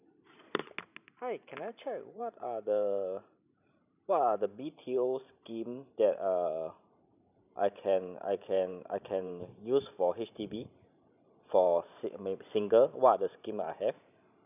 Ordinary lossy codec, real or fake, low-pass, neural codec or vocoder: none; fake; 3.6 kHz; vocoder, 44.1 kHz, 128 mel bands every 512 samples, BigVGAN v2